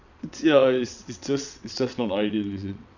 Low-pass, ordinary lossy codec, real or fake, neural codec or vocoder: 7.2 kHz; none; fake; vocoder, 22.05 kHz, 80 mel bands, WaveNeXt